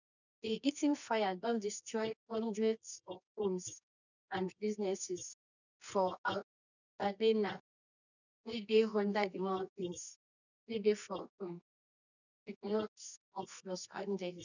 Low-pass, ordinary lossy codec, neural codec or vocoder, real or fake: 7.2 kHz; none; codec, 24 kHz, 0.9 kbps, WavTokenizer, medium music audio release; fake